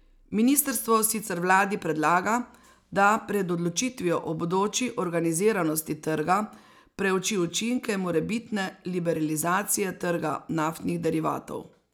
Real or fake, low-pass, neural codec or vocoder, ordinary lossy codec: real; none; none; none